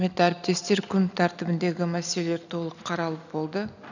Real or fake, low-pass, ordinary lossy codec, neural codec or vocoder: real; 7.2 kHz; none; none